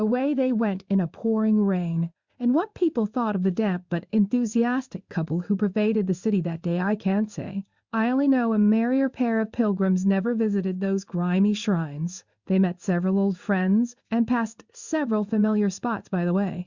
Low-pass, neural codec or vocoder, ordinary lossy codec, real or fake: 7.2 kHz; codec, 16 kHz in and 24 kHz out, 1 kbps, XY-Tokenizer; Opus, 64 kbps; fake